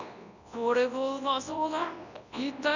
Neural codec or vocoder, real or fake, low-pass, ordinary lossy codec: codec, 24 kHz, 0.9 kbps, WavTokenizer, large speech release; fake; 7.2 kHz; none